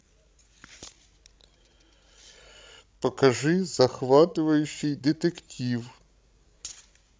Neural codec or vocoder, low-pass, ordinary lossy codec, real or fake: codec, 16 kHz, 16 kbps, FreqCodec, larger model; none; none; fake